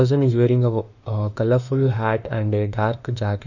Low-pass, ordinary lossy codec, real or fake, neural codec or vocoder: 7.2 kHz; none; fake; autoencoder, 48 kHz, 32 numbers a frame, DAC-VAE, trained on Japanese speech